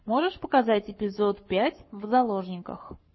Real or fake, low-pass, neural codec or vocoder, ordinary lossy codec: fake; 7.2 kHz; codec, 44.1 kHz, 7.8 kbps, Pupu-Codec; MP3, 24 kbps